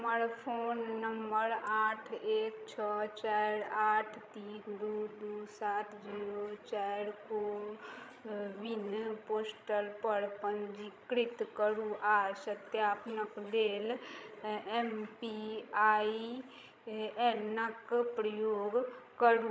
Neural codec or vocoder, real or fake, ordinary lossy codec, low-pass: codec, 16 kHz, 16 kbps, FreqCodec, larger model; fake; none; none